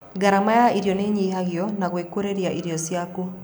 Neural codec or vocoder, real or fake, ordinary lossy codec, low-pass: none; real; none; none